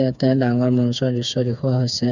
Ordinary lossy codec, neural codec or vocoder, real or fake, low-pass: none; codec, 16 kHz, 4 kbps, FreqCodec, smaller model; fake; 7.2 kHz